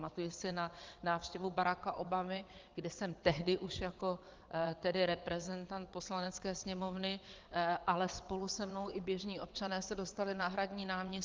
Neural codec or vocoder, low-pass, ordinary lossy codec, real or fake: vocoder, 22.05 kHz, 80 mel bands, WaveNeXt; 7.2 kHz; Opus, 24 kbps; fake